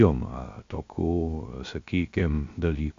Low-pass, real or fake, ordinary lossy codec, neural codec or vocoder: 7.2 kHz; fake; AAC, 48 kbps; codec, 16 kHz, 0.3 kbps, FocalCodec